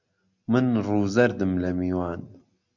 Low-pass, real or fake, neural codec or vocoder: 7.2 kHz; real; none